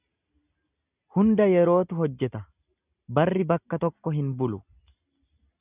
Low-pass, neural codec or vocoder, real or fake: 3.6 kHz; none; real